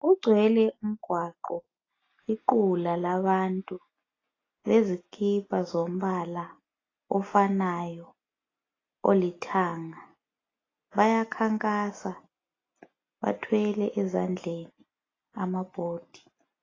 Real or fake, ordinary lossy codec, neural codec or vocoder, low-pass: real; AAC, 32 kbps; none; 7.2 kHz